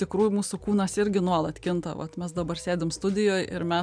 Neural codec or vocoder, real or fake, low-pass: none; real; 9.9 kHz